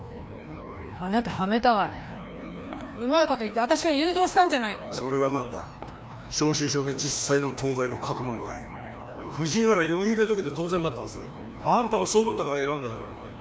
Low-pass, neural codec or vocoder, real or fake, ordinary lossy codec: none; codec, 16 kHz, 1 kbps, FreqCodec, larger model; fake; none